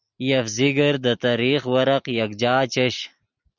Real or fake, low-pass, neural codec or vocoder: real; 7.2 kHz; none